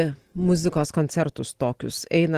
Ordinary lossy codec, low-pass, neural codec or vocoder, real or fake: Opus, 16 kbps; 14.4 kHz; none; real